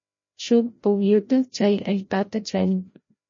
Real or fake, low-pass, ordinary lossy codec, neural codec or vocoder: fake; 7.2 kHz; MP3, 32 kbps; codec, 16 kHz, 0.5 kbps, FreqCodec, larger model